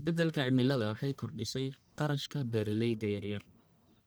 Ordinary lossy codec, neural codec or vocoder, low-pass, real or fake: none; codec, 44.1 kHz, 1.7 kbps, Pupu-Codec; none; fake